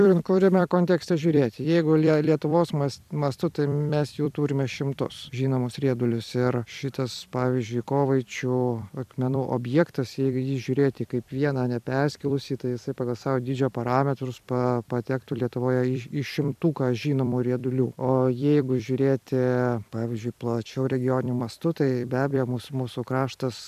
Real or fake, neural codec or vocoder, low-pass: fake; vocoder, 44.1 kHz, 128 mel bands every 256 samples, BigVGAN v2; 14.4 kHz